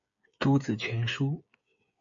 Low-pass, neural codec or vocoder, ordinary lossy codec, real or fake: 7.2 kHz; codec, 16 kHz, 8 kbps, FreqCodec, smaller model; AAC, 64 kbps; fake